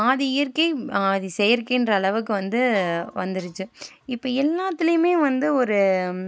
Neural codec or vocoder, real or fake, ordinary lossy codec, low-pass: none; real; none; none